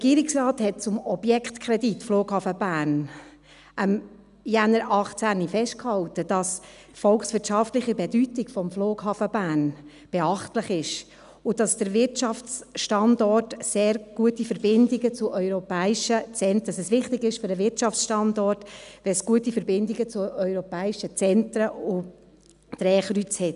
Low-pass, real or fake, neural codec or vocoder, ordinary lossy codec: 10.8 kHz; real; none; none